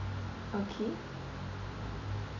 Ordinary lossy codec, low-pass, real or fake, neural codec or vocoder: none; 7.2 kHz; real; none